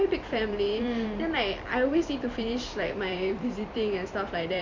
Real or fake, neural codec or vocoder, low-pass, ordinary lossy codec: fake; vocoder, 44.1 kHz, 128 mel bands every 256 samples, BigVGAN v2; 7.2 kHz; MP3, 48 kbps